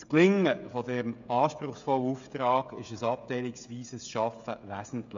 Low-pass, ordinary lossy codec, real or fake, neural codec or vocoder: 7.2 kHz; MP3, 64 kbps; fake; codec, 16 kHz, 16 kbps, FreqCodec, smaller model